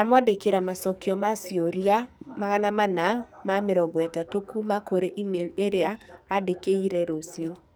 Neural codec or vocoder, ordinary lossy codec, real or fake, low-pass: codec, 44.1 kHz, 2.6 kbps, SNAC; none; fake; none